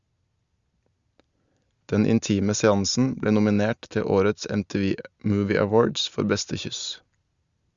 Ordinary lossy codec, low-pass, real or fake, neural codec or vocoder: Opus, 64 kbps; 7.2 kHz; real; none